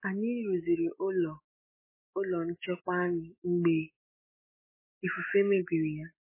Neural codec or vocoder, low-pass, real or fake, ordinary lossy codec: autoencoder, 48 kHz, 128 numbers a frame, DAC-VAE, trained on Japanese speech; 3.6 kHz; fake; MP3, 16 kbps